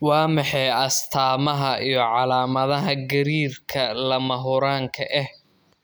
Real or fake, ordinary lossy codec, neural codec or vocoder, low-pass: real; none; none; none